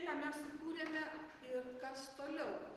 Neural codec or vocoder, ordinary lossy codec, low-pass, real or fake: none; Opus, 16 kbps; 14.4 kHz; real